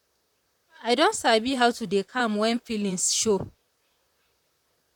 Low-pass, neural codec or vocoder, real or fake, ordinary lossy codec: 19.8 kHz; vocoder, 44.1 kHz, 128 mel bands, Pupu-Vocoder; fake; none